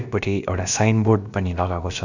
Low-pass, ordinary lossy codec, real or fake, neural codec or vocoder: 7.2 kHz; none; fake; codec, 16 kHz, about 1 kbps, DyCAST, with the encoder's durations